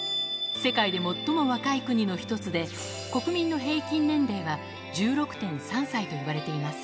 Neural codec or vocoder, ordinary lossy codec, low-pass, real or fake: none; none; none; real